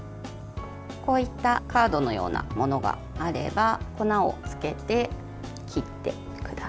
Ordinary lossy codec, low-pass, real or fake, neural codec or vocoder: none; none; real; none